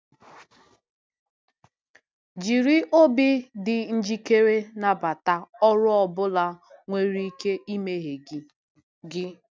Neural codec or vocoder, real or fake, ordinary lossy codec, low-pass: none; real; none; none